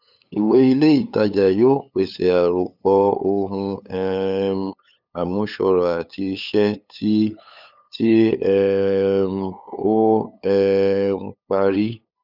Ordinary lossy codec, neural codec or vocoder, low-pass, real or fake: none; codec, 16 kHz, 8 kbps, FunCodec, trained on LibriTTS, 25 frames a second; 5.4 kHz; fake